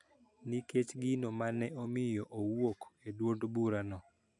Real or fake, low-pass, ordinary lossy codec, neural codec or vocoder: real; 10.8 kHz; none; none